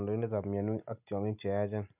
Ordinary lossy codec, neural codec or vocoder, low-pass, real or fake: none; none; 3.6 kHz; real